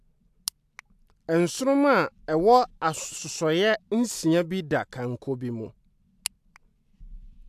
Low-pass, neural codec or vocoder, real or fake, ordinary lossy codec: 14.4 kHz; none; real; AAC, 96 kbps